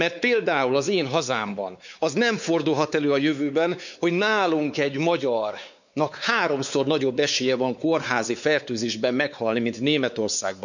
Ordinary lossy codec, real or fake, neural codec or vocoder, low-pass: none; fake; codec, 16 kHz, 4 kbps, X-Codec, WavLM features, trained on Multilingual LibriSpeech; 7.2 kHz